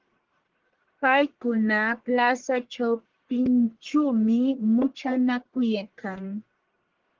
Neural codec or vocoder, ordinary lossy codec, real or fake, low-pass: codec, 44.1 kHz, 1.7 kbps, Pupu-Codec; Opus, 16 kbps; fake; 7.2 kHz